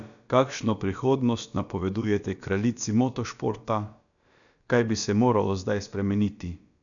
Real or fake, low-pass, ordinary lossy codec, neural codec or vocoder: fake; 7.2 kHz; none; codec, 16 kHz, about 1 kbps, DyCAST, with the encoder's durations